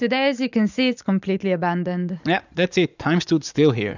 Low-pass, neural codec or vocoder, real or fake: 7.2 kHz; vocoder, 44.1 kHz, 80 mel bands, Vocos; fake